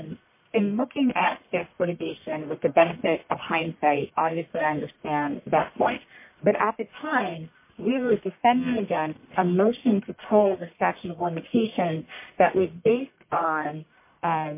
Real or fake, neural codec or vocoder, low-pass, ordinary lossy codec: fake; codec, 44.1 kHz, 1.7 kbps, Pupu-Codec; 3.6 kHz; MP3, 24 kbps